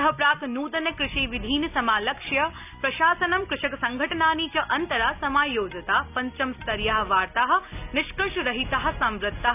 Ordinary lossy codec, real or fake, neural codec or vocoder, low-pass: none; real; none; 3.6 kHz